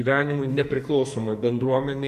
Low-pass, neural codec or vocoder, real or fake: 14.4 kHz; codec, 44.1 kHz, 2.6 kbps, SNAC; fake